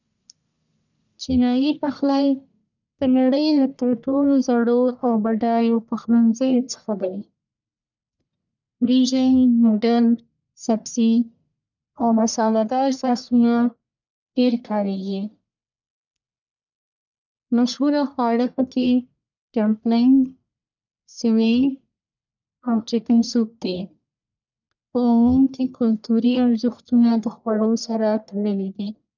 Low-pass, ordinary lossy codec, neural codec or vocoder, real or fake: 7.2 kHz; none; codec, 44.1 kHz, 1.7 kbps, Pupu-Codec; fake